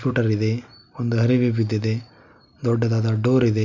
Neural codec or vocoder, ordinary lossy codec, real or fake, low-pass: none; none; real; 7.2 kHz